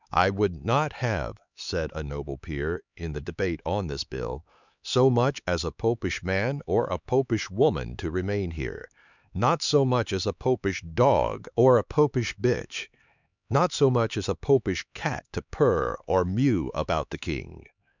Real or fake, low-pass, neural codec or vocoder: fake; 7.2 kHz; codec, 16 kHz, 4 kbps, X-Codec, HuBERT features, trained on LibriSpeech